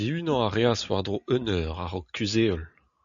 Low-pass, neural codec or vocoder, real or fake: 7.2 kHz; none; real